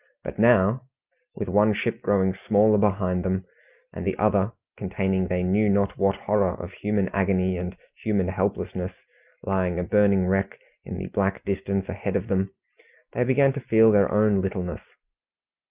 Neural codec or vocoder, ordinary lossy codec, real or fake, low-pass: none; Opus, 24 kbps; real; 3.6 kHz